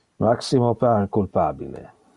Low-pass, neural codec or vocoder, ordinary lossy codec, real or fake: 10.8 kHz; none; Opus, 64 kbps; real